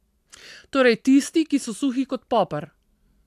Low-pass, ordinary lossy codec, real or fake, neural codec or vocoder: 14.4 kHz; AAC, 96 kbps; real; none